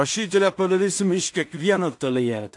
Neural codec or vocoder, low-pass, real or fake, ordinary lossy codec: codec, 16 kHz in and 24 kHz out, 0.4 kbps, LongCat-Audio-Codec, two codebook decoder; 10.8 kHz; fake; AAC, 64 kbps